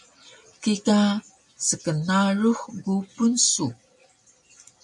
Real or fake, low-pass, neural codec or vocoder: real; 10.8 kHz; none